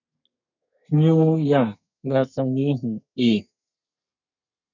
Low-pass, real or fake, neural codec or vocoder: 7.2 kHz; fake; codec, 32 kHz, 1.9 kbps, SNAC